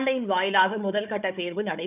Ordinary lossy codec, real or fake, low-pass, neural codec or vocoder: none; fake; 3.6 kHz; codec, 16 kHz, 4 kbps, FunCodec, trained on Chinese and English, 50 frames a second